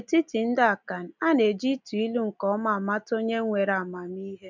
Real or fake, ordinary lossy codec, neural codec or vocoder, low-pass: real; none; none; 7.2 kHz